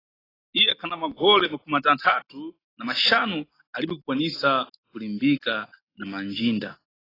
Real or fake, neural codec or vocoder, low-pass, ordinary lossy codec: real; none; 5.4 kHz; AAC, 24 kbps